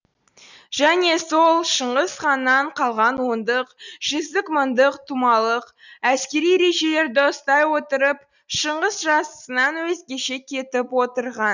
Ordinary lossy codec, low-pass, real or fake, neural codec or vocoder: none; 7.2 kHz; real; none